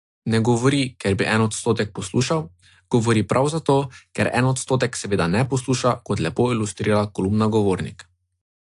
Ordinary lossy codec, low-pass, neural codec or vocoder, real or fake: AAC, 64 kbps; 10.8 kHz; none; real